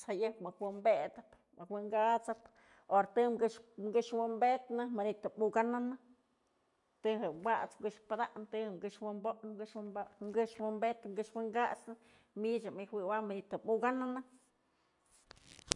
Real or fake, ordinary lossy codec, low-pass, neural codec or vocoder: real; none; 10.8 kHz; none